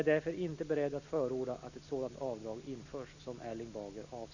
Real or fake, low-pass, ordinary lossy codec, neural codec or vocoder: real; 7.2 kHz; none; none